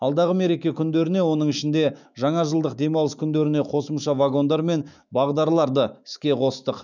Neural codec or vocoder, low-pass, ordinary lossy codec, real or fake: autoencoder, 48 kHz, 128 numbers a frame, DAC-VAE, trained on Japanese speech; 7.2 kHz; none; fake